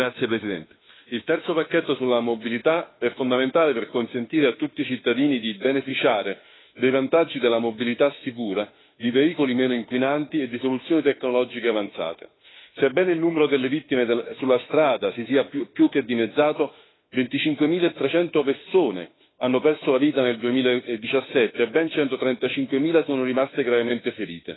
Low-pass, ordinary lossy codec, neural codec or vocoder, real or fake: 7.2 kHz; AAC, 16 kbps; autoencoder, 48 kHz, 32 numbers a frame, DAC-VAE, trained on Japanese speech; fake